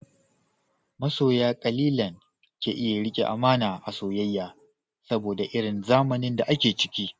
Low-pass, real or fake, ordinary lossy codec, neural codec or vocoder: none; real; none; none